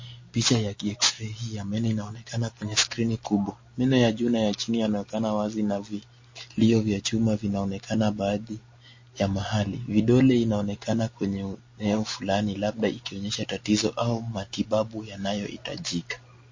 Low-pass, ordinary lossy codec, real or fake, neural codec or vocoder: 7.2 kHz; MP3, 32 kbps; real; none